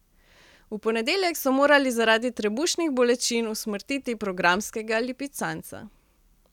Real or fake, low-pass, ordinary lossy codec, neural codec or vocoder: real; 19.8 kHz; none; none